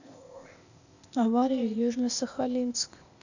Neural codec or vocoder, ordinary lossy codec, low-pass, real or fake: codec, 16 kHz, 0.8 kbps, ZipCodec; none; 7.2 kHz; fake